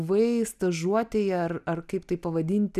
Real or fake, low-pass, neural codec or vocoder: real; 14.4 kHz; none